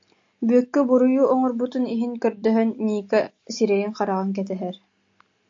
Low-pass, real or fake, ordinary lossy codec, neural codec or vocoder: 7.2 kHz; real; AAC, 48 kbps; none